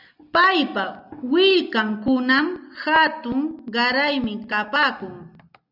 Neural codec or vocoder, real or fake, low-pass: none; real; 5.4 kHz